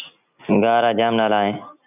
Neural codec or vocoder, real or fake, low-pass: none; real; 3.6 kHz